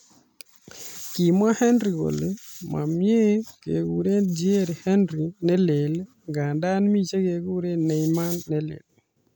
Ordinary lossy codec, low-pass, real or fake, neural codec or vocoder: none; none; real; none